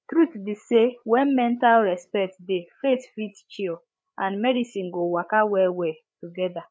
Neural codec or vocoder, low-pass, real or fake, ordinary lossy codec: codec, 16 kHz, 8 kbps, FreqCodec, larger model; none; fake; none